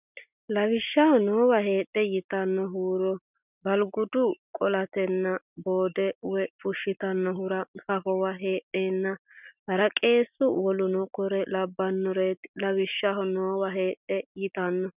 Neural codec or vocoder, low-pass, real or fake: none; 3.6 kHz; real